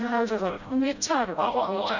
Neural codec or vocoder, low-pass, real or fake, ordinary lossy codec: codec, 16 kHz, 0.5 kbps, FreqCodec, smaller model; 7.2 kHz; fake; none